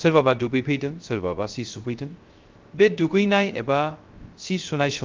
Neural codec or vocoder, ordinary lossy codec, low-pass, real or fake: codec, 16 kHz, 0.3 kbps, FocalCodec; Opus, 32 kbps; 7.2 kHz; fake